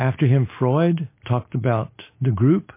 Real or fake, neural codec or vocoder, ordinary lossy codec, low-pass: real; none; MP3, 24 kbps; 3.6 kHz